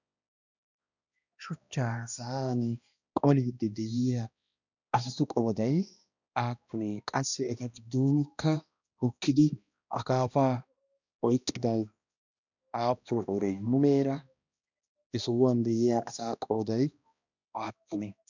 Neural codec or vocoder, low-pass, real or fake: codec, 16 kHz, 1 kbps, X-Codec, HuBERT features, trained on balanced general audio; 7.2 kHz; fake